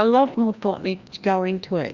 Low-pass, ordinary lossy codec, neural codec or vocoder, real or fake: 7.2 kHz; Opus, 64 kbps; codec, 16 kHz, 1 kbps, FreqCodec, larger model; fake